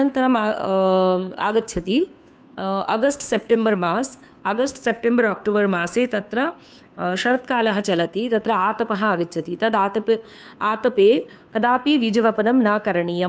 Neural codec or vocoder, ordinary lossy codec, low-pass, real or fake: codec, 16 kHz, 2 kbps, FunCodec, trained on Chinese and English, 25 frames a second; none; none; fake